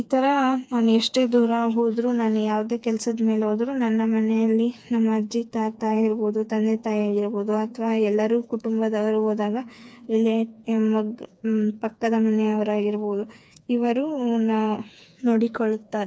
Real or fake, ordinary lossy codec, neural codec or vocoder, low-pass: fake; none; codec, 16 kHz, 4 kbps, FreqCodec, smaller model; none